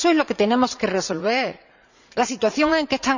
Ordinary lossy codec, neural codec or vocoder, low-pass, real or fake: none; vocoder, 44.1 kHz, 80 mel bands, Vocos; 7.2 kHz; fake